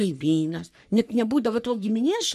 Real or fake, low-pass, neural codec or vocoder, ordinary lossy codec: fake; 14.4 kHz; codec, 44.1 kHz, 3.4 kbps, Pupu-Codec; MP3, 96 kbps